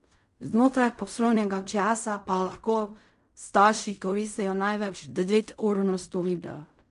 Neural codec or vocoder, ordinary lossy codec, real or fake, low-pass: codec, 16 kHz in and 24 kHz out, 0.4 kbps, LongCat-Audio-Codec, fine tuned four codebook decoder; none; fake; 10.8 kHz